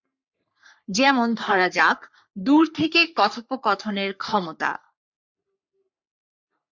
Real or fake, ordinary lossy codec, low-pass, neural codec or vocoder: fake; MP3, 64 kbps; 7.2 kHz; codec, 44.1 kHz, 3.4 kbps, Pupu-Codec